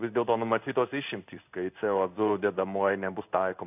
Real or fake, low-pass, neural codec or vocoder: fake; 3.6 kHz; codec, 16 kHz in and 24 kHz out, 1 kbps, XY-Tokenizer